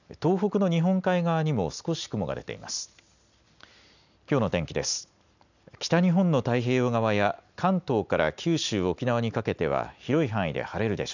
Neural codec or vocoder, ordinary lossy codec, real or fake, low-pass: none; none; real; 7.2 kHz